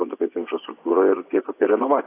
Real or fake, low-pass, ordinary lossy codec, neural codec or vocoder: fake; 3.6 kHz; AAC, 24 kbps; vocoder, 24 kHz, 100 mel bands, Vocos